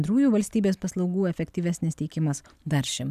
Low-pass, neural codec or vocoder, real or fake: 14.4 kHz; none; real